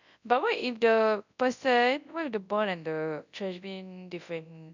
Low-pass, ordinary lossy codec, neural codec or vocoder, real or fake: 7.2 kHz; none; codec, 24 kHz, 0.9 kbps, WavTokenizer, large speech release; fake